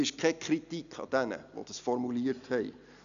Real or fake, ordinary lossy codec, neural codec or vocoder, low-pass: real; none; none; 7.2 kHz